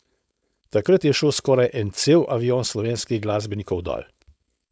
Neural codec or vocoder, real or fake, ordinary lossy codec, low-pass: codec, 16 kHz, 4.8 kbps, FACodec; fake; none; none